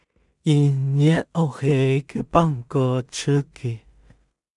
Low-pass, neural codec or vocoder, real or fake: 10.8 kHz; codec, 16 kHz in and 24 kHz out, 0.4 kbps, LongCat-Audio-Codec, two codebook decoder; fake